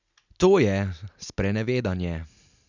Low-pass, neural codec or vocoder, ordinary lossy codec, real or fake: 7.2 kHz; none; none; real